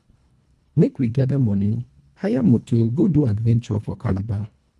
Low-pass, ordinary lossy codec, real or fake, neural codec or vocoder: none; none; fake; codec, 24 kHz, 1.5 kbps, HILCodec